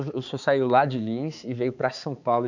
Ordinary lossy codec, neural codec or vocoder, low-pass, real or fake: none; codec, 16 kHz, 4 kbps, X-Codec, HuBERT features, trained on balanced general audio; 7.2 kHz; fake